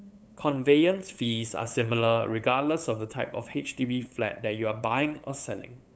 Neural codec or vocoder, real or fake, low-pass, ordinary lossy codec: codec, 16 kHz, 8 kbps, FunCodec, trained on LibriTTS, 25 frames a second; fake; none; none